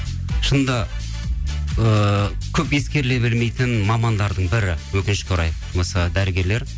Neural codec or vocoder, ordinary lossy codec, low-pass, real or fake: none; none; none; real